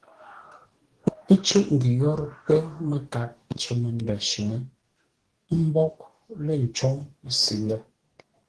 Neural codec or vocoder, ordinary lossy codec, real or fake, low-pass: codec, 44.1 kHz, 2.6 kbps, DAC; Opus, 16 kbps; fake; 10.8 kHz